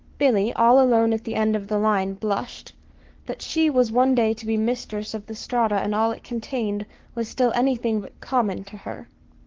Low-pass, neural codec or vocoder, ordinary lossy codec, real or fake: 7.2 kHz; codec, 44.1 kHz, 7.8 kbps, Pupu-Codec; Opus, 16 kbps; fake